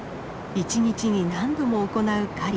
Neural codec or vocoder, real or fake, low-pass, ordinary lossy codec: none; real; none; none